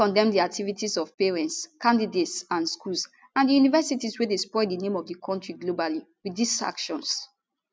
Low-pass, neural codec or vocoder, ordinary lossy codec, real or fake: none; none; none; real